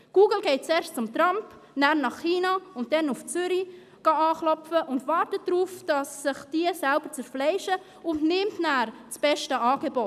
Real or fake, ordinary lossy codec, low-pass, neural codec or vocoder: real; none; 14.4 kHz; none